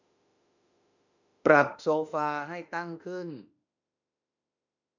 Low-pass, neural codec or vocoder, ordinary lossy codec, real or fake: 7.2 kHz; autoencoder, 48 kHz, 32 numbers a frame, DAC-VAE, trained on Japanese speech; none; fake